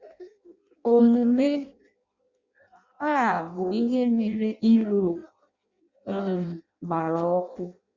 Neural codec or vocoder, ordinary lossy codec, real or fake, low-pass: codec, 16 kHz in and 24 kHz out, 0.6 kbps, FireRedTTS-2 codec; Opus, 64 kbps; fake; 7.2 kHz